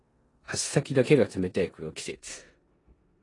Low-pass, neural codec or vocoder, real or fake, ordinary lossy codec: 10.8 kHz; codec, 16 kHz in and 24 kHz out, 0.9 kbps, LongCat-Audio-Codec, four codebook decoder; fake; AAC, 32 kbps